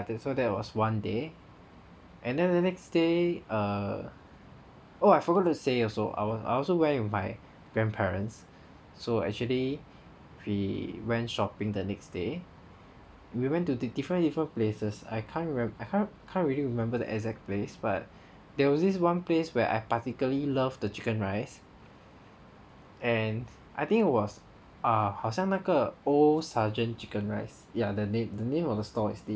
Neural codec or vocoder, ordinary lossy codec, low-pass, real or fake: none; none; none; real